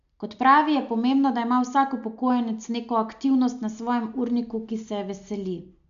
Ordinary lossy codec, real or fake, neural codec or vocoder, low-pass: none; real; none; 7.2 kHz